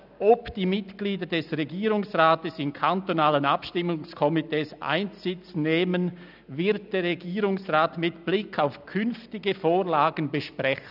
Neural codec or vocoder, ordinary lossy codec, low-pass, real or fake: none; none; 5.4 kHz; real